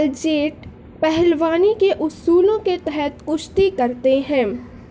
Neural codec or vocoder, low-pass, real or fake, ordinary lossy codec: none; none; real; none